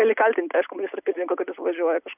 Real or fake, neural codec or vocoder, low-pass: real; none; 3.6 kHz